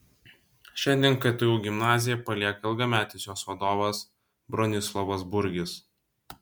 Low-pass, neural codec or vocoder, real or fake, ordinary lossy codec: 19.8 kHz; none; real; MP3, 96 kbps